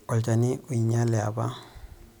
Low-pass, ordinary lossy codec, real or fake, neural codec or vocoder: none; none; real; none